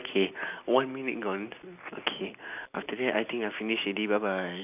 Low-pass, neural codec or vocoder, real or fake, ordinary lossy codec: 3.6 kHz; none; real; none